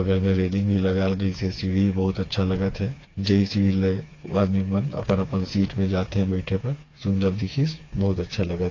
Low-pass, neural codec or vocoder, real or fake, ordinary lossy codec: 7.2 kHz; codec, 16 kHz, 4 kbps, FreqCodec, smaller model; fake; AAC, 32 kbps